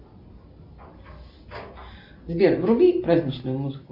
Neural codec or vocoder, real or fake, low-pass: none; real; 5.4 kHz